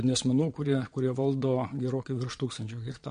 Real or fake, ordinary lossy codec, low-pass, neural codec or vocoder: fake; MP3, 48 kbps; 9.9 kHz; vocoder, 22.05 kHz, 80 mel bands, Vocos